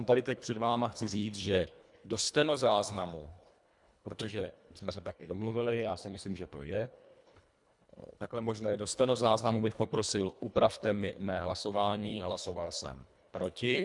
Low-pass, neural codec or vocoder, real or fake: 10.8 kHz; codec, 24 kHz, 1.5 kbps, HILCodec; fake